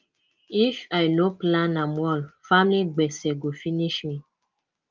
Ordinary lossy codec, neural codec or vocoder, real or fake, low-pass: Opus, 32 kbps; none; real; 7.2 kHz